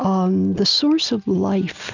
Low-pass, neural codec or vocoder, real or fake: 7.2 kHz; none; real